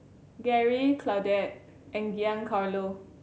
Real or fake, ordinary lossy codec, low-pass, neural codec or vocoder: real; none; none; none